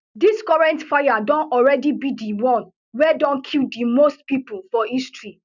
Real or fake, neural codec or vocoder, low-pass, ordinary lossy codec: real; none; 7.2 kHz; none